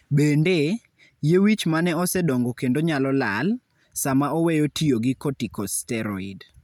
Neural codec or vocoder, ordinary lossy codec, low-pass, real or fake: none; none; 19.8 kHz; real